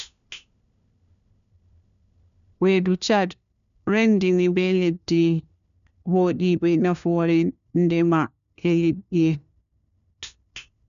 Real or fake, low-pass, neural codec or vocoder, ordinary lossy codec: fake; 7.2 kHz; codec, 16 kHz, 1 kbps, FunCodec, trained on LibriTTS, 50 frames a second; none